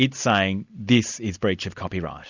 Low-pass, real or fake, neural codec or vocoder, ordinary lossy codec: 7.2 kHz; real; none; Opus, 64 kbps